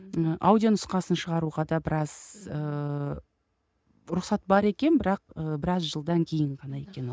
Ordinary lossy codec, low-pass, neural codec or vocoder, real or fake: none; none; none; real